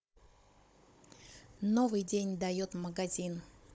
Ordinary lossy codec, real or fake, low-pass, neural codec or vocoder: none; fake; none; codec, 16 kHz, 16 kbps, FunCodec, trained on Chinese and English, 50 frames a second